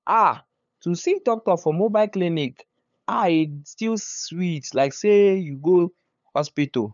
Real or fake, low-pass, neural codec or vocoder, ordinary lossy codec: fake; 7.2 kHz; codec, 16 kHz, 8 kbps, FunCodec, trained on LibriTTS, 25 frames a second; none